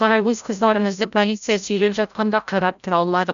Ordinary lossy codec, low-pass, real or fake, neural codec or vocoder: none; 7.2 kHz; fake; codec, 16 kHz, 0.5 kbps, FreqCodec, larger model